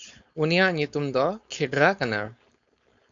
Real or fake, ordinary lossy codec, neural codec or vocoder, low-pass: fake; AAC, 64 kbps; codec, 16 kHz, 4.8 kbps, FACodec; 7.2 kHz